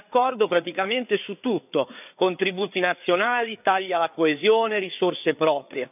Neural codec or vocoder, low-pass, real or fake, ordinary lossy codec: codec, 16 kHz, 4 kbps, FreqCodec, larger model; 3.6 kHz; fake; none